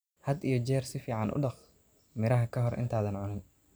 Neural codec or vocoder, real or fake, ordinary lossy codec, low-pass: none; real; none; none